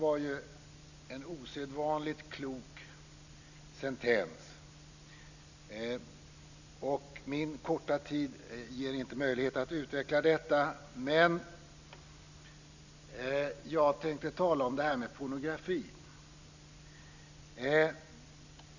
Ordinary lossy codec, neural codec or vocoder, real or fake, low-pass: none; none; real; 7.2 kHz